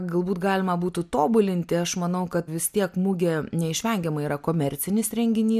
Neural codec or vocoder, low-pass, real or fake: none; 14.4 kHz; real